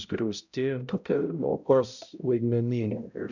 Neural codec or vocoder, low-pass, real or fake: codec, 16 kHz, 0.5 kbps, X-Codec, HuBERT features, trained on balanced general audio; 7.2 kHz; fake